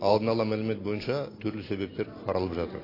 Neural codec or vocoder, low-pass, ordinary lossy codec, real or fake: none; 5.4 kHz; AAC, 24 kbps; real